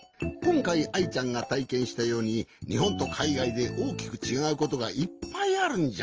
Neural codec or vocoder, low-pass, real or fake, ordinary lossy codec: none; 7.2 kHz; real; Opus, 24 kbps